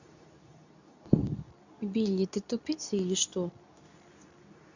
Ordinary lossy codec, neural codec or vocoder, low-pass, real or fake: none; codec, 24 kHz, 0.9 kbps, WavTokenizer, medium speech release version 2; 7.2 kHz; fake